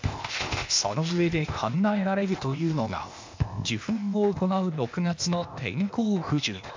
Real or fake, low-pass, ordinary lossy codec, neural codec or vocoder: fake; 7.2 kHz; MP3, 64 kbps; codec, 16 kHz, 0.8 kbps, ZipCodec